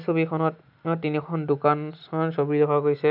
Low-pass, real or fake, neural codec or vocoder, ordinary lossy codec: 5.4 kHz; real; none; none